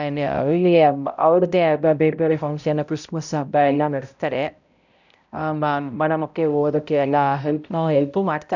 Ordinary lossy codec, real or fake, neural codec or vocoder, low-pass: none; fake; codec, 16 kHz, 0.5 kbps, X-Codec, HuBERT features, trained on balanced general audio; 7.2 kHz